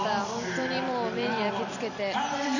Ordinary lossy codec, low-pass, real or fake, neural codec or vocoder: none; 7.2 kHz; fake; vocoder, 44.1 kHz, 128 mel bands every 256 samples, BigVGAN v2